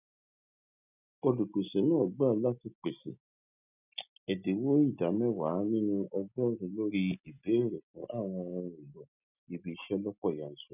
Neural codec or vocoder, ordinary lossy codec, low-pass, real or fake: none; none; 3.6 kHz; real